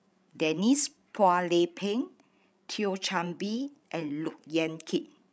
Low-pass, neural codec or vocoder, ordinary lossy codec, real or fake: none; codec, 16 kHz, 16 kbps, FreqCodec, larger model; none; fake